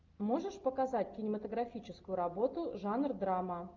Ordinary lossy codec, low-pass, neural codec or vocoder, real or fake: Opus, 24 kbps; 7.2 kHz; none; real